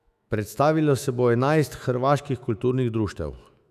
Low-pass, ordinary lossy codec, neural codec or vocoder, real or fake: 14.4 kHz; none; autoencoder, 48 kHz, 128 numbers a frame, DAC-VAE, trained on Japanese speech; fake